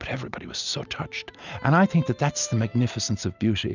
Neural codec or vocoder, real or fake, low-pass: none; real; 7.2 kHz